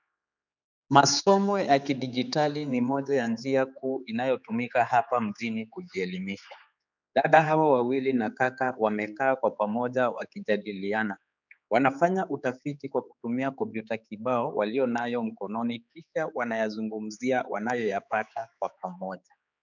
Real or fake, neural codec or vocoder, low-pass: fake; codec, 16 kHz, 4 kbps, X-Codec, HuBERT features, trained on general audio; 7.2 kHz